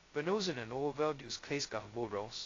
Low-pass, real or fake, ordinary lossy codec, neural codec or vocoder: 7.2 kHz; fake; AAC, 32 kbps; codec, 16 kHz, 0.2 kbps, FocalCodec